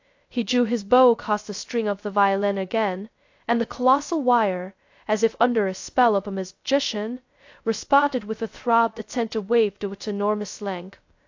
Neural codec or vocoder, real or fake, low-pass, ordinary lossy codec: codec, 16 kHz, 0.2 kbps, FocalCodec; fake; 7.2 kHz; AAC, 48 kbps